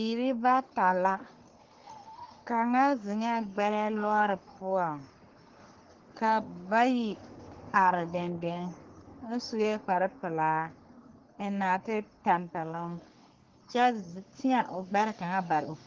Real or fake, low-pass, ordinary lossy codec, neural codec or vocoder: fake; 7.2 kHz; Opus, 16 kbps; codec, 44.1 kHz, 3.4 kbps, Pupu-Codec